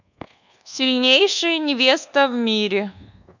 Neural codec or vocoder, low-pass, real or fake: codec, 24 kHz, 1.2 kbps, DualCodec; 7.2 kHz; fake